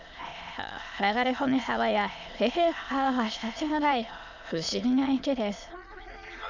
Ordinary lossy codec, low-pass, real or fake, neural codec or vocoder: none; 7.2 kHz; fake; autoencoder, 22.05 kHz, a latent of 192 numbers a frame, VITS, trained on many speakers